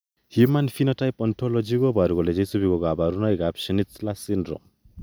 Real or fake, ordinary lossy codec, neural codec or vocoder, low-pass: real; none; none; none